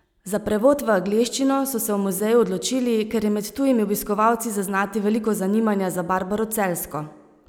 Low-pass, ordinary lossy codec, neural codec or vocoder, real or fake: none; none; none; real